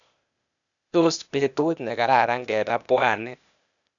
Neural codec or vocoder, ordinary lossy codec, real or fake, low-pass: codec, 16 kHz, 0.8 kbps, ZipCodec; none; fake; 7.2 kHz